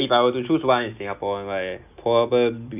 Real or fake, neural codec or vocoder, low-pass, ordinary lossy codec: real; none; 3.6 kHz; none